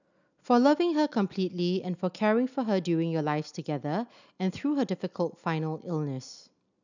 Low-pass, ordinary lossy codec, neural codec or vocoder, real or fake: 7.2 kHz; none; none; real